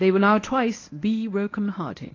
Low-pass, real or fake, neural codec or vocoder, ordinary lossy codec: 7.2 kHz; fake; codec, 24 kHz, 0.9 kbps, WavTokenizer, medium speech release version 1; AAC, 32 kbps